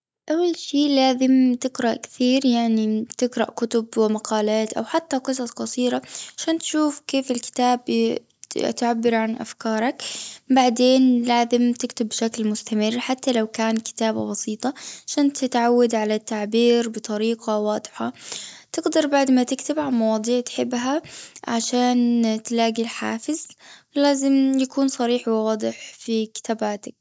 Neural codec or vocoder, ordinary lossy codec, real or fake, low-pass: none; none; real; none